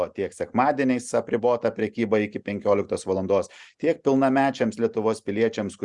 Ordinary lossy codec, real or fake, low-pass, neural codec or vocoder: Opus, 64 kbps; real; 10.8 kHz; none